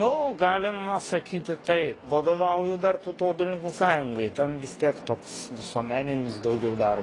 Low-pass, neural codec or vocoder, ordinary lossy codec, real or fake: 10.8 kHz; codec, 44.1 kHz, 2.6 kbps, DAC; AAC, 32 kbps; fake